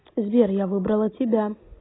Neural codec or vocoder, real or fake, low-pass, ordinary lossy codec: none; real; 7.2 kHz; AAC, 16 kbps